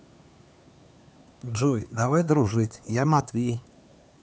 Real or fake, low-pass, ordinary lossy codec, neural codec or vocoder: fake; none; none; codec, 16 kHz, 4 kbps, X-Codec, HuBERT features, trained on LibriSpeech